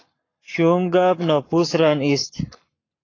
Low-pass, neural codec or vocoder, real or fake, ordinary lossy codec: 7.2 kHz; codec, 44.1 kHz, 7.8 kbps, Pupu-Codec; fake; AAC, 32 kbps